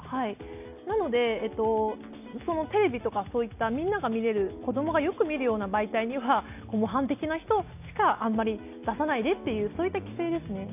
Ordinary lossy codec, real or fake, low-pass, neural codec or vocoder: AAC, 32 kbps; real; 3.6 kHz; none